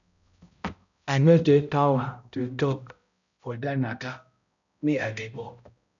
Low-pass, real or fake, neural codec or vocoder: 7.2 kHz; fake; codec, 16 kHz, 0.5 kbps, X-Codec, HuBERT features, trained on balanced general audio